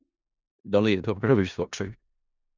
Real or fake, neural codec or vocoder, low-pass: fake; codec, 16 kHz in and 24 kHz out, 0.4 kbps, LongCat-Audio-Codec, four codebook decoder; 7.2 kHz